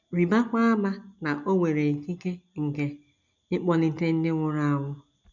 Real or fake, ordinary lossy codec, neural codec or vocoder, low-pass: real; none; none; 7.2 kHz